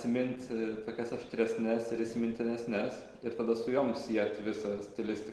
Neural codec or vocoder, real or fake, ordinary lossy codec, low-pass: none; real; Opus, 16 kbps; 10.8 kHz